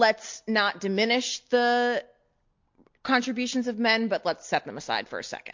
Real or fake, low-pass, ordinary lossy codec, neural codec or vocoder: real; 7.2 kHz; MP3, 48 kbps; none